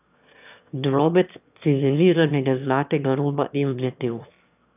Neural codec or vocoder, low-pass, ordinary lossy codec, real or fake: autoencoder, 22.05 kHz, a latent of 192 numbers a frame, VITS, trained on one speaker; 3.6 kHz; none; fake